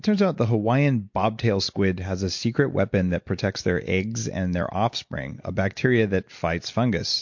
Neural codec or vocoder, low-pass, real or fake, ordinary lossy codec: none; 7.2 kHz; real; MP3, 48 kbps